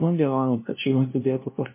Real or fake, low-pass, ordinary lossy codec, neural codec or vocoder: fake; 3.6 kHz; MP3, 24 kbps; codec, 16 kHz, 0.5 kbps, FunCodec, trained on LibriTTS, 25 frames a second